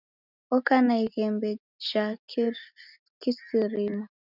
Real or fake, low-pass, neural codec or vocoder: real; 5.4 kHz; none